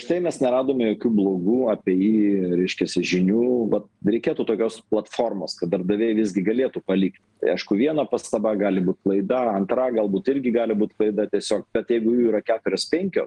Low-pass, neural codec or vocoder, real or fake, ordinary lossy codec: 10.8 kHz; none; real; Opus, 24 kbps